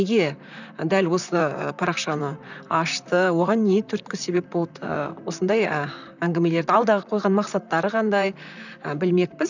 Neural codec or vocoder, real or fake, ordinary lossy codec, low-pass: vocoder, 44.1 kHz, 128 mel bands, Pupu-Vocoder; fake; none; 7.2 kHz